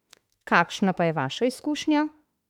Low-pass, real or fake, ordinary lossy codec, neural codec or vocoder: 19.8 kHz; fake; none; autoencoder, 48 kHz, 32 numbers a frame, DAC-VAE, trained on Japanese speech